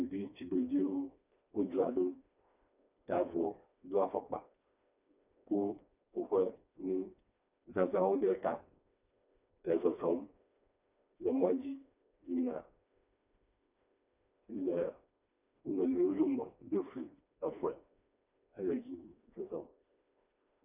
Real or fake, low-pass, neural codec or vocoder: fake; 3.6 kHz; codec, 16 kHz, 2 kbps, FreqCodec, smaller model